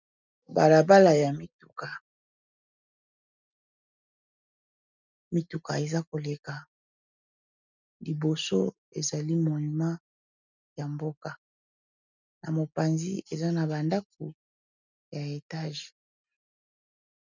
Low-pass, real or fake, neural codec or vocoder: 7.2 kHz; real; none